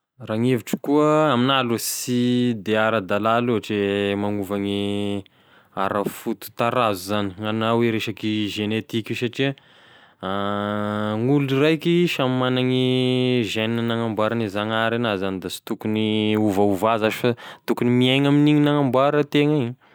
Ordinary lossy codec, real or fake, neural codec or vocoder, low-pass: none; real; none; none